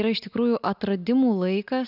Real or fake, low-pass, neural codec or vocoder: real; 5.4 kHz; none